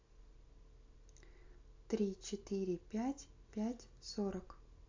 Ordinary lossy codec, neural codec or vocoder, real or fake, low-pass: AAC, 48 kbps; none; real; 7.2 kHz